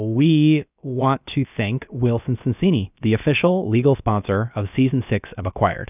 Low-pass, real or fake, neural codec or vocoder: 3.6 kHz; fake; codec, 16 kHz, about 1 kbps, DyCAST, with the encoder's durations